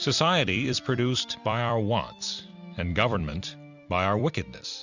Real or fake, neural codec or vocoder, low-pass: fake; vocoder, 44.1 kHz, 128 mel bands every 256 samples, BigVGAN v2; 7.2 kHz